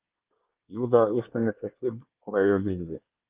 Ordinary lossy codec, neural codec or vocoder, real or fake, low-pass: Opus, 24 kbps; codec, 24 kHz, 1 kbps, SNAC; fake; 3.6 kHz